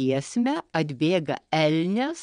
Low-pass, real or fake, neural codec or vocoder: 9.9 kHz; fake; vocoder, 22.05 kHz, 80 mel bands, WaveNeXt